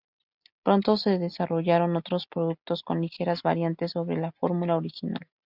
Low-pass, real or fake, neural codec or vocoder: 5.4 kHz; real; none